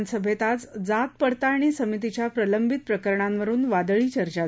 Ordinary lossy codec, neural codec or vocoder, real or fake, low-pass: none; none; real; none